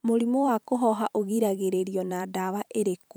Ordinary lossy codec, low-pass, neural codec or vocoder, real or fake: none; none; vocoder, 44.1 kHz, 128 mel bands every 512 samples, BigVGAN v2; fake